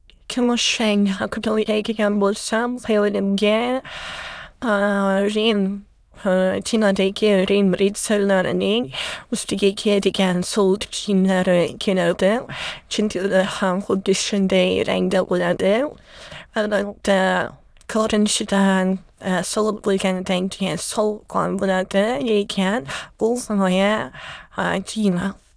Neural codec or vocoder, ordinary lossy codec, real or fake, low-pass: autoencoder, 22.05 kHz, a latent of 192 numbers a frame, VITS, trained on many speakers; none; fake; none